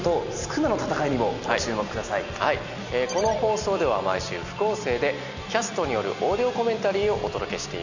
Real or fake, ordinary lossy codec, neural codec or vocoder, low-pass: real; none; none; 7.2 kHz